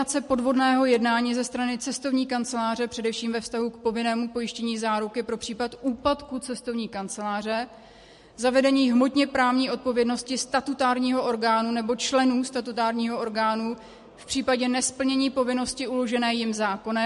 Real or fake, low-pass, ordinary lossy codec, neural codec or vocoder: real; 14.4 kHz; MP3, 48 kbps; none